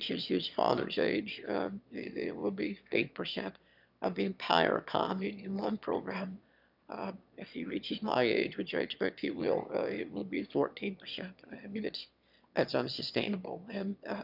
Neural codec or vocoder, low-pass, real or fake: autoencoder, 22.05 kHz, a latent of 192 numbers a frame, VITS, trained on one speaker; 5.4 kHz; fake